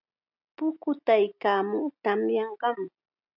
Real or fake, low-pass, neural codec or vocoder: real; 5.4 kHz; none